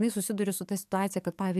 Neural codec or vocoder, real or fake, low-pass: codec, 44.1 kHz, 7.8 kbps, DAC; fake; 14.4 kHz